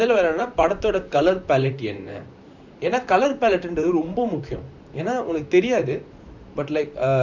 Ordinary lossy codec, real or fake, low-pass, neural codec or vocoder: none; fake; 7.2 kHz; vocoder, 44.1 kHz, 128 mel bands, Pupu-Vocoder